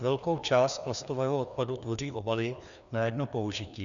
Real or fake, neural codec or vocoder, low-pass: fake; codec, 16 kHz, 2 kbps, FreqCodec, larger model; 7.2 kHz